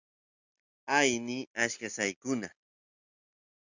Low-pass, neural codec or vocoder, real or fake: 7.2 kHz; none; real